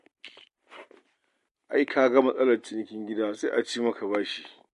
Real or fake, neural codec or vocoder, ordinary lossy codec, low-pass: real; none; MP3, 48 kbps; 14.4 kHz